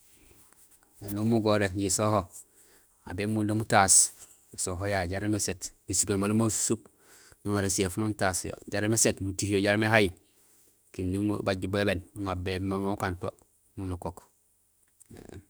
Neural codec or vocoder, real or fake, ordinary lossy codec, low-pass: autoencoder, 48 kHz, 32 numbers a frame, DAC-VAE, trained on Japanese speech; fake; none; none